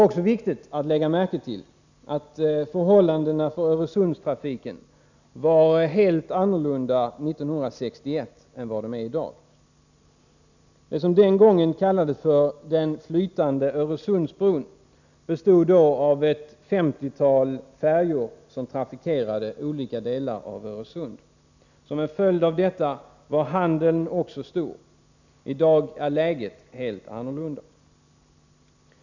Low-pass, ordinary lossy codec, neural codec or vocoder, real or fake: 7.2 kHz; none; none; real